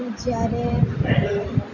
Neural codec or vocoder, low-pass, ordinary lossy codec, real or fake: none; 7.2 kHz; none; real